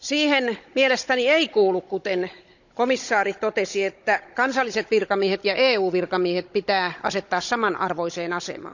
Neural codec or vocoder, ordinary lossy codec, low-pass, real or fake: codec, 16 kHz, 16 kbps, FunCodec, trained on Chinese and English, 50 frames a second; none; 7.2 kHz; fake